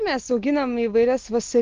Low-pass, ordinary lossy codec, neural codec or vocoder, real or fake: 7.2 kHz; Opus, 24 kbps; none; real